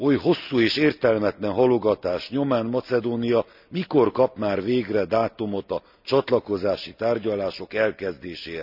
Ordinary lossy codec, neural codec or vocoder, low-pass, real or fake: none; none; 5.4 kHz; real